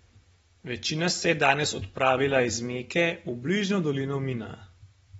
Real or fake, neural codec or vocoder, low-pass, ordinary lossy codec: real; none; 10.8 kHz; AAC, 24 kbps